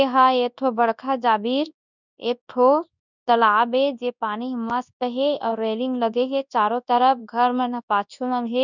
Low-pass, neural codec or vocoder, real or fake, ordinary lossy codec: 7.2 kHz; codec, 24 kHz, 0.9 kbps, WavTokenizer, large speech release; fake; none